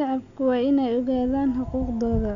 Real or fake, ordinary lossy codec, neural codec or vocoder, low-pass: real; none; none; 7.2 kHz